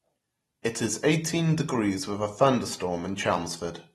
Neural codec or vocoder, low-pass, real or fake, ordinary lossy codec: none; 19.8 kHz; real; AAC, 32 kbps